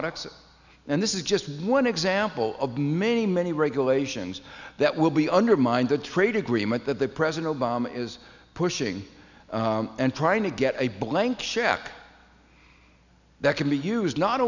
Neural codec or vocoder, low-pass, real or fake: none; 7.2 kHz; real